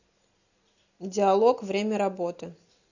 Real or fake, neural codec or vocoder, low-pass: real; none; 7.2 kHz